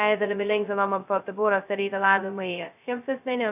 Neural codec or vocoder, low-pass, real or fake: codec, 16 kHz, 0.2 kbps, FocalCodec; 3.6 kHz; fake